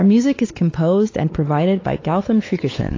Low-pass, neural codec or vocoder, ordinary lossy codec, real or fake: 7.2 kHz; codec, 16 kHz, 4 kbps, X-Codec, WavLM features, trained on Multilingual LibriSpeech; AAC, 32 kbps; fake